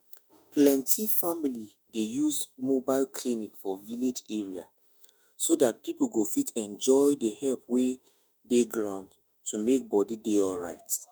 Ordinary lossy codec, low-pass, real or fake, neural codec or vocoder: none; none; fake; autoencoder, 48 kHz, 32 numbers a frame, DAC-VAE, trained on Japanese speech